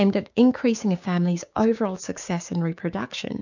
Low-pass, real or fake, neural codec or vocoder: 7.2 kHz; fake; codec, 16 kHz, 6 kbps, DAC